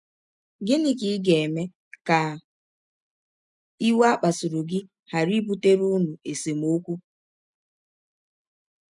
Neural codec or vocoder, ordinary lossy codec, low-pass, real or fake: none; none; 10.8 kHz; real